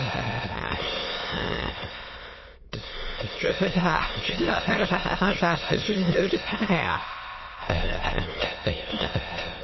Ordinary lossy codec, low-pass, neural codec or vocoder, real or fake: MP3, 24 kbps; 7.2 kHz; autoencoder, 22.05 kHz, a latent of 192 numbers a frame, VITS, trained on many speakers; fake